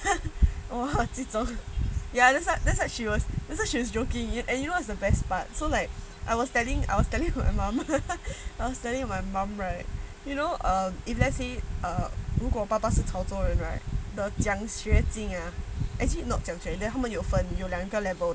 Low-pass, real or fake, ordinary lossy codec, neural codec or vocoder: none; real; none; none